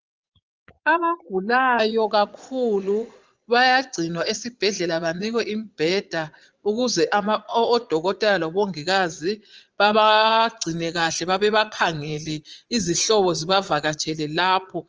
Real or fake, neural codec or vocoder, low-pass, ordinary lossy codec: fake; vocoder, 22.05 kHz, 80 mel bands, Vocos; 7.2 kHz; Opus, 24 kbps